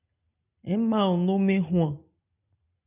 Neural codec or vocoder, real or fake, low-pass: none; real; 3.6 kHz